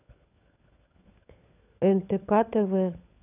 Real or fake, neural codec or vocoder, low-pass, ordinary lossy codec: fake; codec, 16 kHz, 16 kbps, FunCodec, trained on LibriTTS, 50 frames a second; 3.6 kHz; none